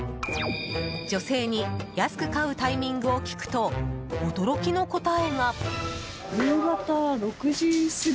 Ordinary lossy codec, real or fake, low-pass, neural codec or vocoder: none; real; none; none